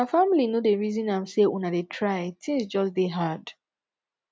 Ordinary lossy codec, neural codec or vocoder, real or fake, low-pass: none; none; real; none